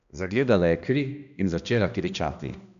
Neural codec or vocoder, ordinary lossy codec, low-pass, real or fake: codec, 16 kHz, 1 kbps, X-Codec, HuBERT features, trained on balanced general audio; none; 7.2 kHz; fake